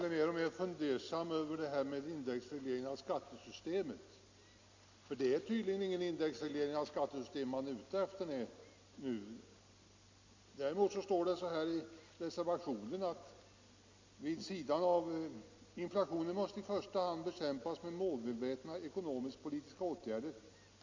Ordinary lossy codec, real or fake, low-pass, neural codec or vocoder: MP3, 64 kbps; real; 7.2 kHz; none